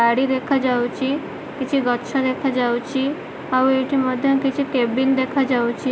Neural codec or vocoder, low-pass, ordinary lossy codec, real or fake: none; none; none; real